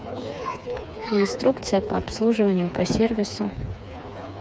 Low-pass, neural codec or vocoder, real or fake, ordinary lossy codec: none; codec, 16 kHz, 4 kbps, FreqCodec, smaller model; fake; none